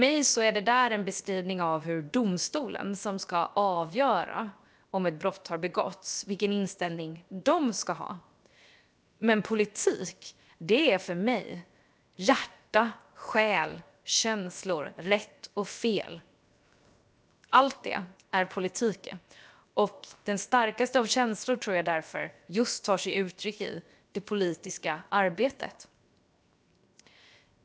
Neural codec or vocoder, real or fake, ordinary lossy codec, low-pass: codec, 16 kHz, 0.7 kbps, FocalCodec; fake; none; none